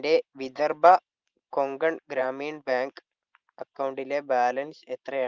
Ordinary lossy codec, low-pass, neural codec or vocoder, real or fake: Opus, 24 kbps; 7.2 kHz; vocoder, 44.1 kHz, 128 mel bands every 512 samples, BigVGAN v2; fake